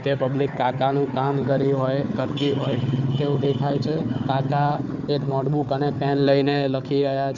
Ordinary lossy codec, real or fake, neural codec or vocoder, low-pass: none; fake; codec, 16 kHz, 4 kbps, FunCodec, trained on Chinese and English, 50 frames a second; 7.2 kHz